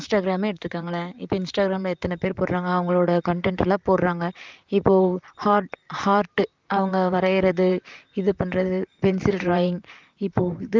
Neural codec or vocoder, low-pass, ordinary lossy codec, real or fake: vocoder, 44.1 kHz, 128 mel bands, Pupu-Vocoder; 7.2 kHz; Opus, 24 kbps; fake